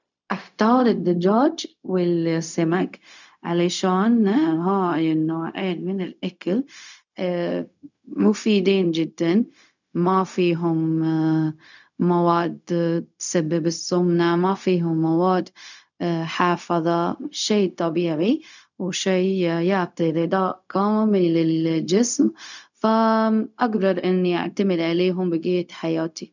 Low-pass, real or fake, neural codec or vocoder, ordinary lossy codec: 7.2 kHz; fake; codec, 16 kHz, 0.4 kbps, LongCat-Audio-Codec; none